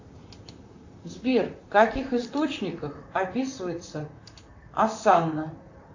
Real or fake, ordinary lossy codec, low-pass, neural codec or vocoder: fake; AAC, 48 kbps; 7.2 kHz; vocoder, 44.1 kHz, 128 mel bands, Pupu-Vocoder